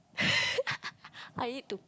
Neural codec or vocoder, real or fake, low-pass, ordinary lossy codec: none; real; none; none